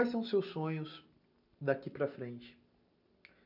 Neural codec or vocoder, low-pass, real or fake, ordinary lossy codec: none; 5.4 kHz; real; none